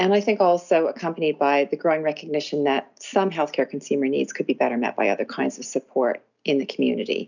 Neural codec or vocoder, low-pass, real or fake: none; 7.2 kHz; real